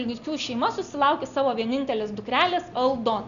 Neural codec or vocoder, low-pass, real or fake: none; 7.2 kHz; real